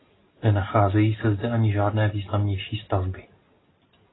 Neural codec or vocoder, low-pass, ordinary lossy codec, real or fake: none; 7.2 kHz; AAC, 16 kbps; real